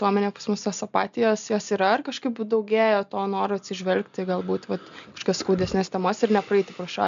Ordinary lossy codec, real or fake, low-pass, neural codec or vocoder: MP3, 64 kbps; real; 7.2 kHz; none